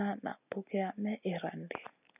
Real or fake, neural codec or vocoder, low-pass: real; none; 3.6 kHz